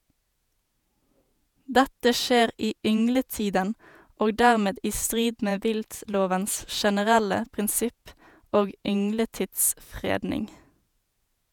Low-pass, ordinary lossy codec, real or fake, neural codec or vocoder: none; none; fake; vocoder, 48 kHz, 128 mel bands, Vocos